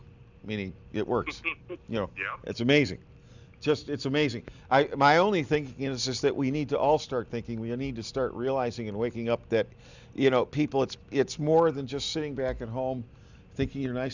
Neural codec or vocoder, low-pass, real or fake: none; 7.2 kHz; real